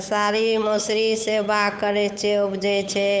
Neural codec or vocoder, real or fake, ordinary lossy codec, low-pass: codec, 16 kHz, 8 kbps, FunCodec, trained on Chinese and English, 25 frames a second; fake; none; none